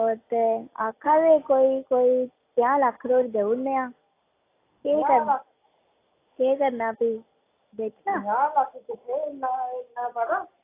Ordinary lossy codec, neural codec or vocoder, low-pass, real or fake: AAC, 24 kbps; none; 3.6 kHz; real